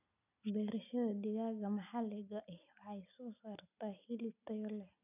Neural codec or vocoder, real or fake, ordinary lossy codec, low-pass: none; real; none; 3.6 kHz